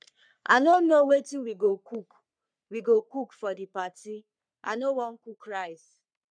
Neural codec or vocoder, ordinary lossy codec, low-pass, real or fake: codec, 44.1 kHz, 3.4 kbps, Pupu-Codec; none; 9.9 kHz; fake